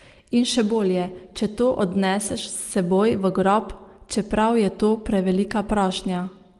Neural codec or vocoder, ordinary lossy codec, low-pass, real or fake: none; Opus, 24 kbps; 10.8 kHz; real